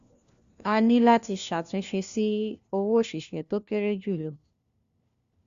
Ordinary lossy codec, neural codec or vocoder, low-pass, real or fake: Opus, 64 kbps; codec, 16 kHz, 1 kbps, FunCodec, trained on LibriTTS, 50 frames a second; 7.2 kHz; fake